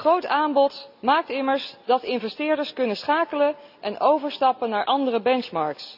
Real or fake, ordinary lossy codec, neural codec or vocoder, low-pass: real; none; none; 5.4 kHz